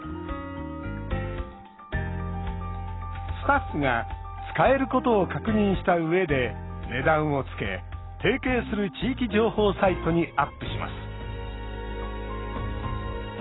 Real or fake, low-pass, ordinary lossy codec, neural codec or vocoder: real; 7.2 kHz; AAC, 16 kbps; none